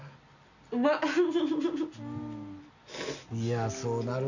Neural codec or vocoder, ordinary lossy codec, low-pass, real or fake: none; none; 7.2 kHz; real